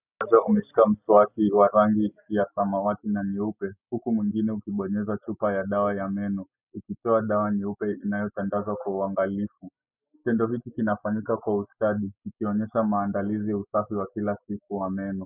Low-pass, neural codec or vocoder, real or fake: 3.6 kHz; none; real